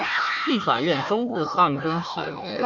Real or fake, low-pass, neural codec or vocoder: fake; 7.2 kHz; codec, 16 kHz, 1 kbps, FunCodec, trained on Chinese and English, 50 frames a second